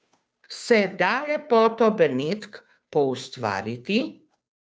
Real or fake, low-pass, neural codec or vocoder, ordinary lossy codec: fake; none; codec, 16 kHz, 2 kbps, FunCodec, trained on Chinese and English, 25 frames a second; none